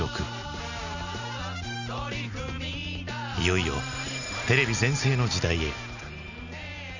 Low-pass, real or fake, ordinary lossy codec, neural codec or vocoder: 7.2 kHz; real; none; none